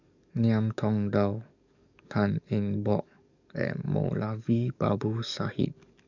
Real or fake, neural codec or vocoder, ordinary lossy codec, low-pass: fake; codec, 44.1 kHz, 7.8 kbps, DAC; none; 7.2 kHz